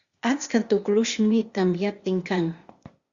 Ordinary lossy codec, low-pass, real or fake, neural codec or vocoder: Opus, 64 kbps; 7.2 kHz; fake; codec, 16 kHz, 0.8 kbps, ZipCodec